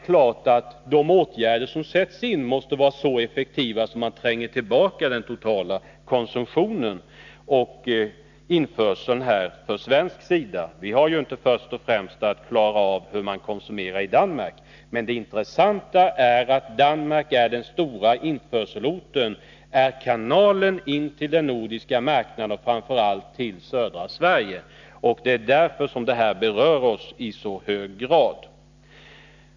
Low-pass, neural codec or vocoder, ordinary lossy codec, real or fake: 7.2 kHz; none; none; real